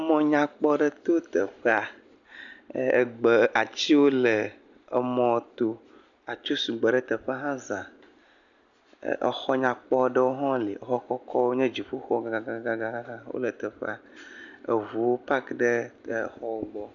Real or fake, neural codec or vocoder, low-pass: real; none; 7.2 kHz